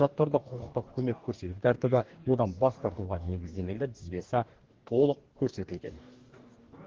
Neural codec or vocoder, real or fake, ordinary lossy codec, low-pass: codec, 44.1 kHz, 2.6 kbps, DAC; fake; Opus, 16 kbps; 7.2 kHz